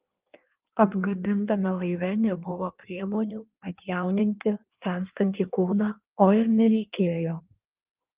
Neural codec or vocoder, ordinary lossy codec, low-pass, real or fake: codec, 16 kHz in and 24 kHz out, 1.1 kbps, FireRedTTS-2 codec; Opus, 32 kbps; 3.6 kHz; fake